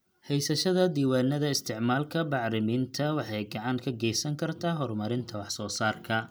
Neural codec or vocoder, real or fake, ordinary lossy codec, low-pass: none; real; none; none